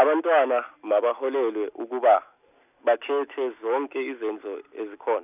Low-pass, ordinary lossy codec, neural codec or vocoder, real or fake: 3.6 kHz; none; none; real